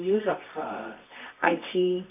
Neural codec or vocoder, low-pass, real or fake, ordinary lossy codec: codec, 24 kHz, 0.9 kbps, WavTokenizer, medium music audio release; 3.6 kHz; fake; none